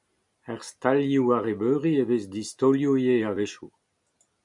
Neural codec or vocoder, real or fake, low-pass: none; real; 10.8 kHz